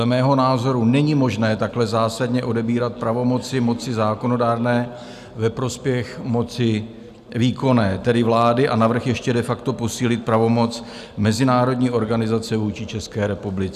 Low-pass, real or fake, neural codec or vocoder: 14.4 kHz; real; none